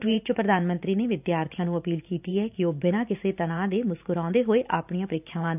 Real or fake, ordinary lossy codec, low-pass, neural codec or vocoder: fake; none; 3.6 kHz; vocoder, 44.1 kHz, 128 mel bands every 512 samples, BigVGAN v2